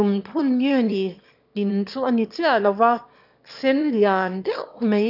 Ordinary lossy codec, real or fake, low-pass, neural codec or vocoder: none; fake; 5.4 kHz; autoencoder, 22.05 kHz, a latent of 192 numbers a frame, VITS, trained on one speaker